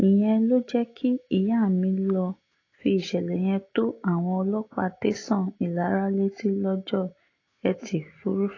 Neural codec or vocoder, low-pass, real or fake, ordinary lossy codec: none; 7.2 kHz; real; AAC, 32 kbps